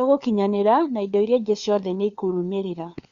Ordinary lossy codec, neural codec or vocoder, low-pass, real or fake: Opus, 64 kbps; codec, 16 kHz, 4 kbps, FunCodec, trained on LibriTTS, 50 frames a second; 7.2 kHz; fake